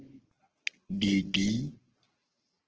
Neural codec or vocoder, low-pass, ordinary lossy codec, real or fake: codec, 44.1 kHz, 7.8 kbps, Pupu-Codec; 7.2 kHz; Opus, 16 kbps; fake